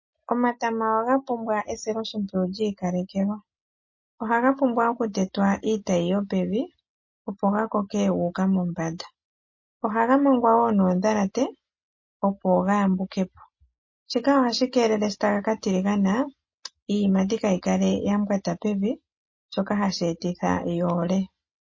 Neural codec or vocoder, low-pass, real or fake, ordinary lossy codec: none; 7.2 kHz; real; MP3, 32 kbps